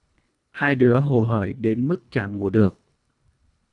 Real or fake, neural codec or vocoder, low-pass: fake; codec, 24 kHz, 1.5 kbps, HILCodec; 10.8 kHz